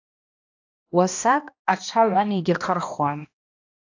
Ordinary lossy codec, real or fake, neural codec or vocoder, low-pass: AAC, 48 kbps; fake; codec, 16 kHz, 1 kbps, X-Codec, HuBERT features, trained on balanced general audio; 7.2 kHz